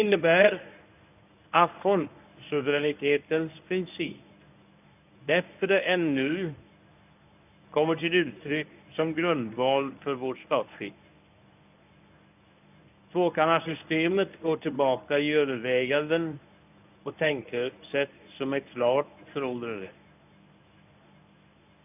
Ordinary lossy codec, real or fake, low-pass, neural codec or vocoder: none; fake; 3.6 kHz; codec, 24 kHz, 0.9 kbps, WavTokenizer, medium speech release version 1